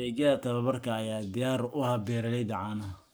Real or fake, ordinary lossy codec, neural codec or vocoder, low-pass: fake; none; codec, 44.1 kHz, 7.8 kbps, Pupu-Codec; none